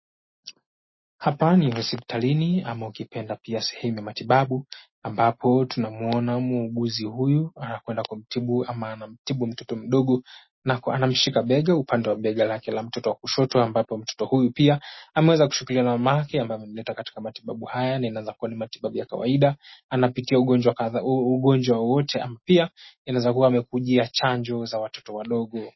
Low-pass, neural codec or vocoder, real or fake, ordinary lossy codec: 7.2 kHz; none; real; MP3, 24 kbps